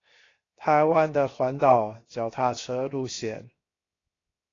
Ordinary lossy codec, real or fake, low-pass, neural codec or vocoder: AAC, 32 kbps; fake; 7.2 kHz; codec, 16 kHz, 0.7 kbps, FocalCodec